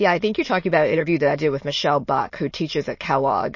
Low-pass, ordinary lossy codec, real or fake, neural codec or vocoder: 7.2 kHz; MP3, 32 kbps; fake; autoencoder, 22.05 kHz, a latent of 192 numbers a frame, VITS, trained on many speakers